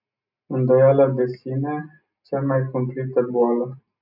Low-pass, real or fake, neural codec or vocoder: 5.4 kHz; real; none